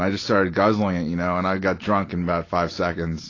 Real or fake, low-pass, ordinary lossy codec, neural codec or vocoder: real; 7.2 kHz; AAC, 32 kbps; none